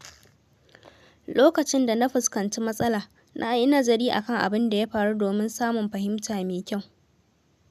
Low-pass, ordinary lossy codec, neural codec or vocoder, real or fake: 14.4 kHz; none; none; real